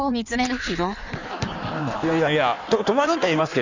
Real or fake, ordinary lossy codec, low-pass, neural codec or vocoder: fake; none; 7.2 kHz; codec, 16 kHz in and 24 kHz out, 1.1 kbps, FireRedTTS-2 codec